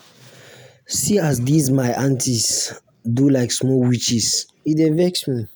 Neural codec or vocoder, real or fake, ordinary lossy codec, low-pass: none; real; none; none